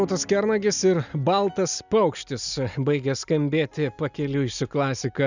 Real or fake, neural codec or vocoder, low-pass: real; none; 7.2 kHz